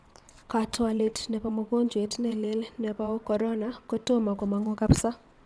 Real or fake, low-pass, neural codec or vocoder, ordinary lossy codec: fake; none; vocoder, 22.05 kHz, 80 mel bands, WaveNeXt; none